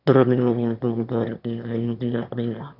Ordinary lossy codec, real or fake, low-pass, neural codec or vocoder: none; fake; 5.4 kHz; autoencoder, 22.05 kHz, a latent of 192 numbers a frame, VITS, trained on one speaker